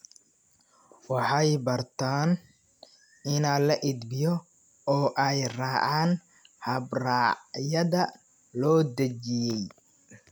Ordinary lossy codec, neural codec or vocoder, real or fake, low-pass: none; none; real; none